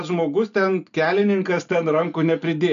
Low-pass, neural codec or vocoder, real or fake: 7.2 kHz; none; real